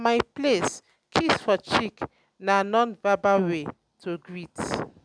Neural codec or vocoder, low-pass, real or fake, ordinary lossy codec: none; 9.9 kHz; real; none